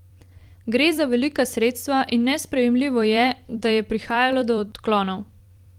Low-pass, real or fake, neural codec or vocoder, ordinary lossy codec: 19.8 kHz; fake; vocoder, 44.1 kHz, 128 mel bands every 256 samples, BigVGAN v2; Opus, 24 kbps